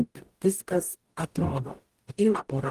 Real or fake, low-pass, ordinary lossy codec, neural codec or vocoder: fake; 14.4 kHz; Opus, 24 kbps; codec, 44.1 kHz, 0.9 kbps, DAC